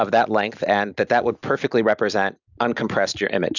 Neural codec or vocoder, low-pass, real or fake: none; 7.2 kHz; real